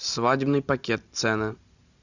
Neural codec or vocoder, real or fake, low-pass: none; real; 7.2 kHz